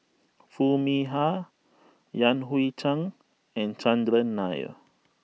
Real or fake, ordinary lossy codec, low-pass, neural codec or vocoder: real; none; none; none